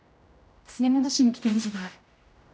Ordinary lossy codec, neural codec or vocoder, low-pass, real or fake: none; codec, 16 kHz, 0.5 kbps, X-Codec, HuBERT features, trained on general audio; none; fake